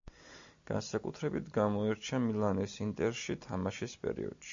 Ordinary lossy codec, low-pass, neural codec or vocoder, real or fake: MP3, 64 kbps; 7.2 kHz; none; real